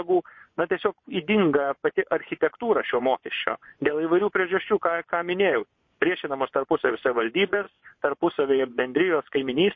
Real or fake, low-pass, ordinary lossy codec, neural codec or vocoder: fake; 7.2 kHz; MP3, 32 kbps; vocoder, 24 kHz, 100 mel bands, Vocos